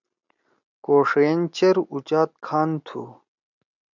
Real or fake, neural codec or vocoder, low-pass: real; none; 7.2 kHz